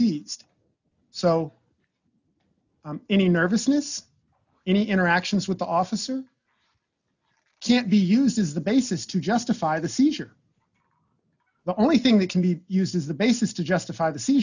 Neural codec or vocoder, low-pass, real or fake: none; 7.2 kHz; real